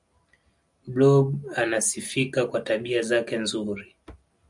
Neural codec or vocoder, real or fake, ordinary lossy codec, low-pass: none; real; MP3, 96 kbps; 10.8 kHz